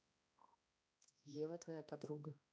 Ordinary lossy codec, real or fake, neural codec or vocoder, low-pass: none; fake; codec, 16 kHz, 1 kbps, X-Codec, HuBERT features, trained on balanced general audio; none